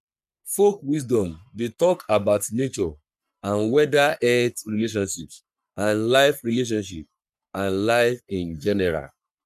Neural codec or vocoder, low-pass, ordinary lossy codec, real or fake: codec, 44.1 kHz, 3.4 kbps, Pupu-Codec; 14.4 kHz; none; fake